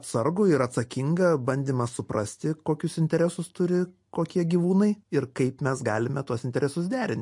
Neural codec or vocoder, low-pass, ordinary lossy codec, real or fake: none; 10.8 kHz; MP3, 48 kbps; real